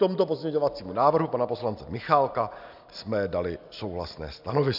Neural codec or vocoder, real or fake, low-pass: none; real; 5.4 kHz